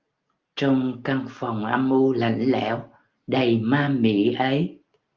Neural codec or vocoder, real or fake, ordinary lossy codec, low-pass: none; real; Opus, 24 kbps; 7.2 kHz